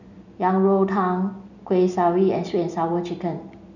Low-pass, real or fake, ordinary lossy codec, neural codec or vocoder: 7.2 kHz; real; none; none